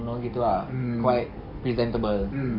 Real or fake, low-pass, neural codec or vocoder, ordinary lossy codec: fake; 5.4 kHz; codec, 44.1 kHz, 7.8 kbps, DAC; none